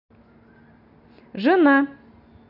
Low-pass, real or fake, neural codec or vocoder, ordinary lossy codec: 5.4 kHz; real; none; none